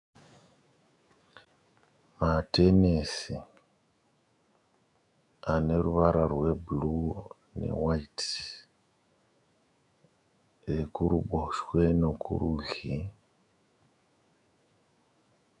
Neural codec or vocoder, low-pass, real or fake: autoencoder, 48 kHz, 128 numbers a frame, DAC-VAE, trained on Japanese speech; 10.8 kHz; fake